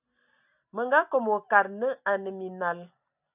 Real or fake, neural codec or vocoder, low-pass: real; none; 3.6 kHz